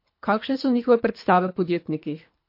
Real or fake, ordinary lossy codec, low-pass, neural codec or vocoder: fake; MP3, 32 kbps; 5.4 kHz; codec, 24 kHz, 3 kbps, HILCodec